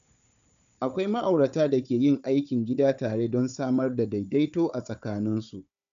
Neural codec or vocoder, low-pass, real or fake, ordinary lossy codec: codec, 16 kHz, 4 kbps, FunCodec, trained on Chinese and English, 50 frames a second; 7.2 kHz; fake; none